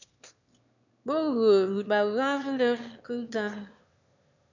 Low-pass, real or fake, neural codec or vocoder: 7.2 kHz; fake; autoencoder, 22.05 kHz, a latent of 192 numbers a frame, VITS, trained on one speaker